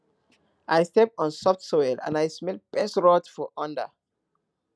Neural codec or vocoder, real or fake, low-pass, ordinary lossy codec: none; real; none; none